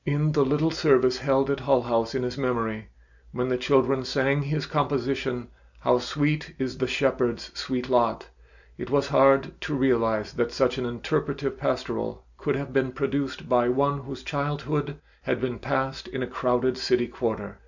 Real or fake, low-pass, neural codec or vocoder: real; 7.2 kHz; none